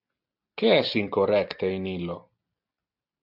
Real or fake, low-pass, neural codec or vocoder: real; 5.4 kHz; none